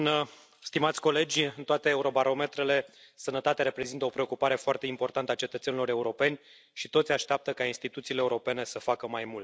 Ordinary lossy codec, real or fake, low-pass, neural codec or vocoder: none; real; none; none